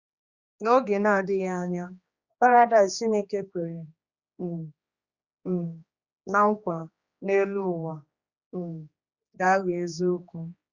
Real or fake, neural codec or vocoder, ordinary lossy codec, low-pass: fake; codec, 16 kHz, 2 kbps, X-Codec, HuBERT features, trained on general audio; Opus, 64 kbps; 7.2 kHz